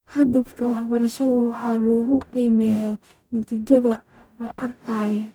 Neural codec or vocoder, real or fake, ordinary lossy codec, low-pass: codec, 44.1 kHz, 0.9 kbps, DAC; fake; none; none